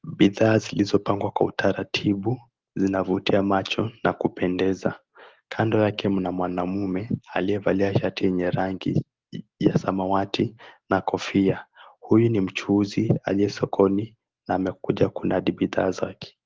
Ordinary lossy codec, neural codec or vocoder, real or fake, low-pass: Opus, 16 kbps; none; real; 7.2 kHz